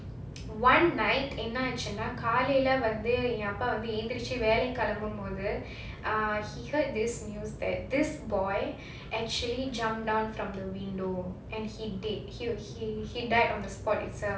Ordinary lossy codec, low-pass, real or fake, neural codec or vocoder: none; none; real; none